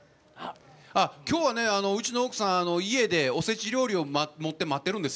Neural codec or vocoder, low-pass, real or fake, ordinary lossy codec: none; none; real; none